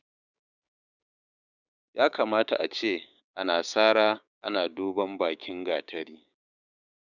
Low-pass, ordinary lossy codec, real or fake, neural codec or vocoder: 7.2 kHz; none; fake; codec, 16 kHz, 6 kbps, DAC